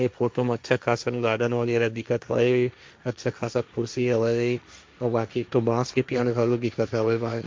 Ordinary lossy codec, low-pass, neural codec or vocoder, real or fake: none; none; codec, 16 kHz, 1.1 kbps, Voila-Tokenizer; fake